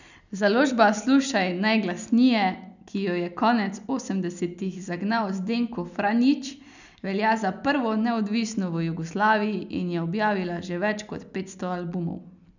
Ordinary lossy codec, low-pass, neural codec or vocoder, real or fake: none; 7.2 kHz; none; real